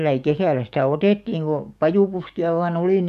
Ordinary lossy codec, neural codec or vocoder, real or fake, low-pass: none; codec, 44.1 kHz, 7.8 kbps, DAC; fake; 14.4 kHz